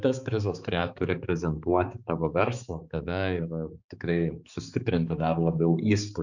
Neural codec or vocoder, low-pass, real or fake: codec, 16 kHz, 4 kbps, X-Codec, HuBERT features, trained on balanced general audio; 7.2 kHz; fake